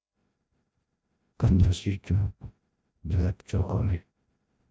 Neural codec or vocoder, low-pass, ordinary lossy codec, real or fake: codec, 16 kHz, 0.5 kbps, FreqCodec, larger model; none; none; fake